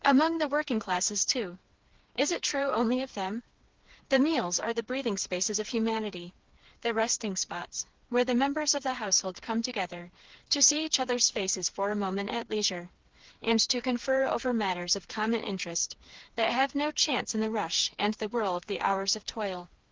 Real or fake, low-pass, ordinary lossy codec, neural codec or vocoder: fake; 7.2 kHz; Opus, 16 kbps; codec, 16 kHz, 4 kbps, FreqCodec, smaller model